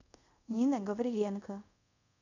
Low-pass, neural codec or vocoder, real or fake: 7.2 kHz; codec, 24 kHz, 0.5 kbps, DualCodec; fake